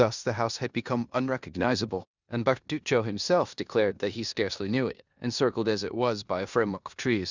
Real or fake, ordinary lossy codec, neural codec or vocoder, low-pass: fake; Opus, 64 kbps; codec, 16 kHz in and 24 kHz out, 0.9 kbps, LongCat-Audio-Codec, four codebook decoder; 7.2 kHz